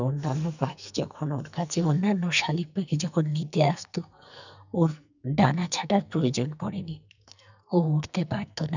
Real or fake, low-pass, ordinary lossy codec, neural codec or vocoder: fake; 7.2 kHz; none; codec, 44.1 kHz, 2.6 kbps, SNAC